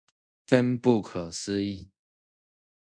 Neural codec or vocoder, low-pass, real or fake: codec, 24 kHz, 0.5 kbps, DualCodec; 9.9 kHz; fake